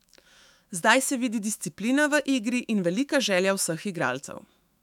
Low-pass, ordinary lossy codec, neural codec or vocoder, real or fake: 19.8 kHz; none; autoencoder, 48 kHz, 128 numbers a frame, DAC-VAE, trained on Japanese speech; fake